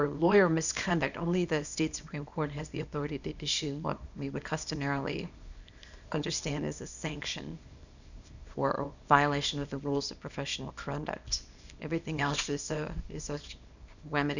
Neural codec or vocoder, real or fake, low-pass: codec, 24 kHz, 0.9 kbps, WavTokenizer, small release; fake; 7.2 kHz